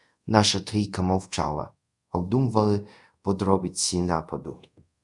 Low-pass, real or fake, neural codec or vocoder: 10.8 kHz; fake; codec, 24 kHz, 0.5 kbps, DualCodec